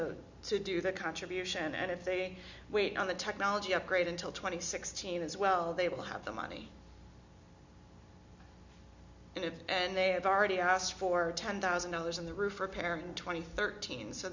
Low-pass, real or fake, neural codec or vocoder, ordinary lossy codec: 7.2 kHz; real; none; Opus, 64 kbps